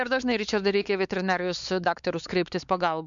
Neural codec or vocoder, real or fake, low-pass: codec, 16 kHz, 4 kbps, X-Codec, WavLM features, trained on Multilingual LibriSpeech; fake; 7.2 kHz